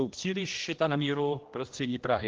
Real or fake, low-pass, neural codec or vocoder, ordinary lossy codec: fake; 7.2 kHz; codec, 16 kHz, 1 kbps, X-Codec, HuBERT features, trained on general audio; Opus, 16 kbps